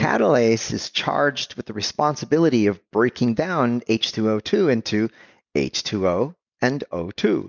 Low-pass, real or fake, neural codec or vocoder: 7.2 kHz; real; none